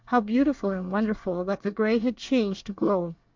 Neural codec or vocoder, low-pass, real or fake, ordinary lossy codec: codec, 24 kHz, 1 kbps, SNAC; 7.2 kHz; fake; MP3, 64 kbps